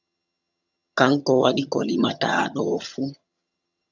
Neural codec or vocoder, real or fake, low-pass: vocoder, 22.05 kHz, 80 mel bands, HiFi-GAN; fake; 7.2 kHz